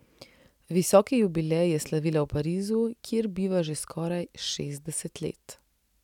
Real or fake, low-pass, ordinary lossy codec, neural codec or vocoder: real; 19.8 kHz; none; none